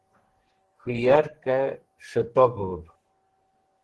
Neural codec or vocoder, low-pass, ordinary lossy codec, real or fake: codec, 44.1 kHz, 2.6 kbps, SNAC; 10.8 kHz; Opus, 16 kbps; fake